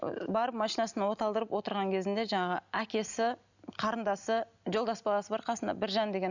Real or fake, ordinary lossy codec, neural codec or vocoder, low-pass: real; none; none; 7.2 kHz